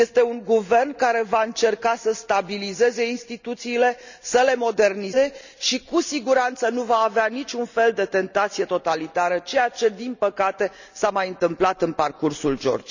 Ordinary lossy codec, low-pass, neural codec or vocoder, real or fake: none; 7.2 kHz; none; real